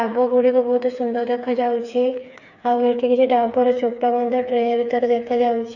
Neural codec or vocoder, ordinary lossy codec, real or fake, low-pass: codec, 16 kHz, 4 kbps, FreqCodec, smaller model; none; fake; 7.2 kHz